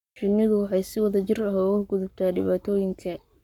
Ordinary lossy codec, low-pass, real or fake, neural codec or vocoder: none; 19.8 kHz; fake; codec, 44.1 kHz, 7.8 kbps, Pupu-Codec